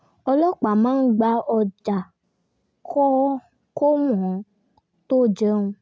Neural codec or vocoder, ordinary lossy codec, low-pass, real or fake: none; none; none; real